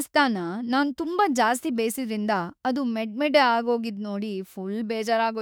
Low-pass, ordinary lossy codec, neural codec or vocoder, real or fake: none; none; autoencoder, 48 kHz, 32 numbers a frame, DAC-VAE, trained on Japanese speech; fake